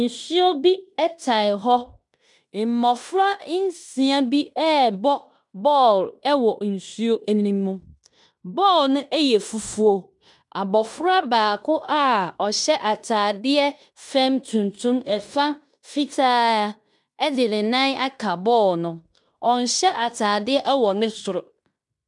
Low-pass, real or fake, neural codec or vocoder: 10.8 kHz; fake; codec, 16 kHz in and 24 kHz out, 0.9 kbps, LongCat-Audio-Codec, fine tuned four codebook decoder